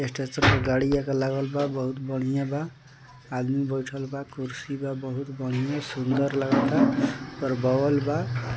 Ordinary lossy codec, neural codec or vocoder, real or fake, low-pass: none; none; real; none